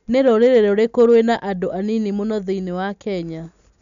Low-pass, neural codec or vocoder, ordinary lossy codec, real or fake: 7.2 kHz; none; none; real